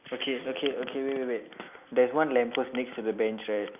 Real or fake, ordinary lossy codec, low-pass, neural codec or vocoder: real; none; 3.6 kHz; none